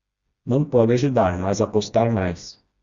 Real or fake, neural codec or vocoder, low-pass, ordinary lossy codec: fake; codec, 16 kHz, 1 kbps, FreqCodec, smaller model; 7.2 kHz; Opus, 64 kbps